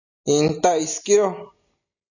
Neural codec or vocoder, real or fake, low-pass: none; real; 7.2 kHz